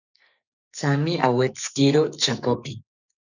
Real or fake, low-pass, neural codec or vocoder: fake; 7.2 kHz; codec, 44.1 kHz, 2.6 kbps, SNAC